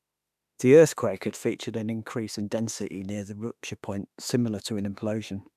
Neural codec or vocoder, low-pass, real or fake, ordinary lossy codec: autoencoder, 48 kHz, 32 numbers a frame, DAC-VAE, trained on Japanese speech; 14.4 kHz; fake; none